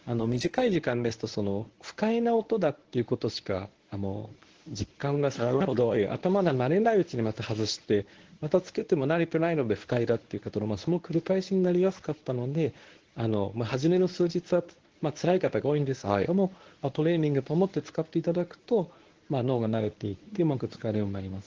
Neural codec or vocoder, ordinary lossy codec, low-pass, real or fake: codec, 24 kHz, 0.9 kbps, WavTokenizer, medium speech release version 1; Opus, 16 kbps; 7.2 kHz; fake